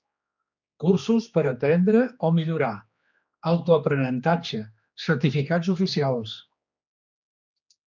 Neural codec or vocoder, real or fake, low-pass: codec, 16 kHz, 2 kbps, X-Codec, HuBERT features, trained on general audio; fake; 7.2 kHz